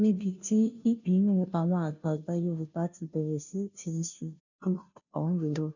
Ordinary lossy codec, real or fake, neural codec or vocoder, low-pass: none; fake; codec, 16 kHz, 0.5 kbps, FunCodec, trained on Chinese and English, 25 frames a second; 7.2 kHz